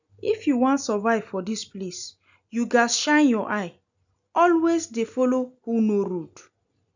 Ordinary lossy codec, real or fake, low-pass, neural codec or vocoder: none; real; 7.2 kHz; none